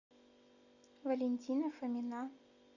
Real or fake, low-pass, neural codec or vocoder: real; 7.2 kHz; none